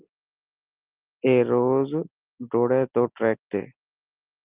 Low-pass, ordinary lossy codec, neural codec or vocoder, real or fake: 3.6 kHz; Opus, 32 kbps; none; real